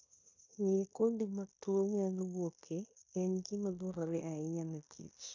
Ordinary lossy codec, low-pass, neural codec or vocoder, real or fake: AAC, 48 kbps; 7.2 kHz; codec, 16 kHz in and 24 kHz out, 0.9 kbps, LongCat-Audio-Codec, fine tuned four codebook decoder; fake